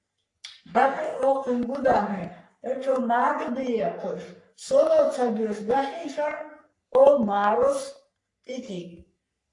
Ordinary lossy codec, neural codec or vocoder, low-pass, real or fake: AAC, 64 kbps; codec, 44.1 kHz, 3.4 kbps, Pupu-Codec; 10.8 kHz; fake